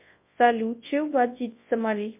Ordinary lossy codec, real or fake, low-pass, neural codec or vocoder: MP3, 24 kbps; fake; 3.6 kHz; codec, 24 kHz, 0.9 kbps, WavTokenizer, large speech release